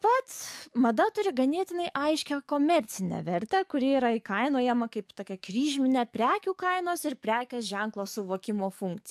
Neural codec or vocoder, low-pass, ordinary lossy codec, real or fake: codec, 44.1 kHz, 7.8 kbps, DAC; 14.4 kHz; AAC, 96 kbps; fake